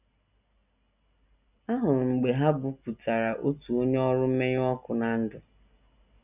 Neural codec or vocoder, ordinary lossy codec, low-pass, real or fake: none; none; 3.6 kHz; real